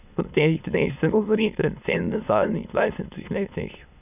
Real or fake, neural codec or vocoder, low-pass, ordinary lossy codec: fake; autoencoder, 22.05 kHz, a latent of 192 numbers a frame, VITS, trained on many speakers; 3.6 kHz; none